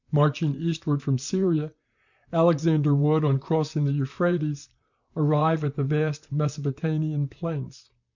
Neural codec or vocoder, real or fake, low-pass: vocoder, 44.1 kHz, 128 mel bands, Pupu-Vocoder; fake; 7.2 kHz